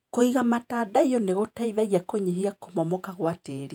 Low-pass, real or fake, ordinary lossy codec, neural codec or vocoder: 19.8 kHz; fake; none; vocoder, 48 kHz, 128 mel bands, Vocos